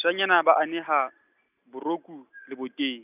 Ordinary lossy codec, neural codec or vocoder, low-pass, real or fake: none; none; 3.6 kHz; real